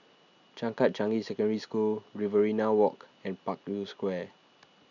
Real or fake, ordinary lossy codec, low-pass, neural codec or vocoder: real; none; 7.2 kHz; none